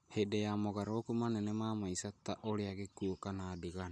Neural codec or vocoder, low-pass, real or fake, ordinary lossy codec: none; none; real; none